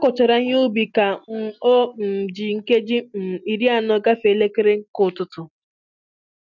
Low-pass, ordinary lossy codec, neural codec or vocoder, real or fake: 7.2 kHz; none; none; real